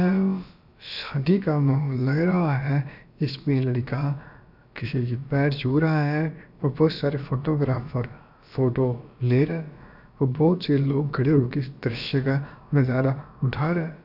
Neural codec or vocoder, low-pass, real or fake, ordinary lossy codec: codec, 16 kHz, about 1 kbps, DyCAST, with the encoder's durations; 5.4 kHz; fake; none